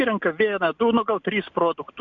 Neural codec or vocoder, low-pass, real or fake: none; 7.2 kHz; real